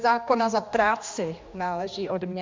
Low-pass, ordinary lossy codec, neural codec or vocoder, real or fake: 7.2 kHz; MP3, 64 kbps; codec, 16 kHz, 2 kbps, X-Codec, HuBERT features, trained on general audio; fake